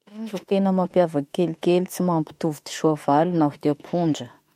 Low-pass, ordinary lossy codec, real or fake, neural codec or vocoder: 19.8 kHz; MP3, 64 kbps; fake; autoencoder, 48 kHz, 32 numbers a frame, DAC-VAE, trained on Japanese speech